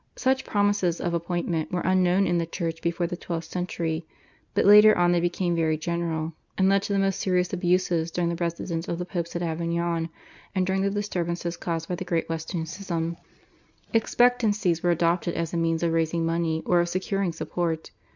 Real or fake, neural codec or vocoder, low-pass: real; none; 7.2 kHz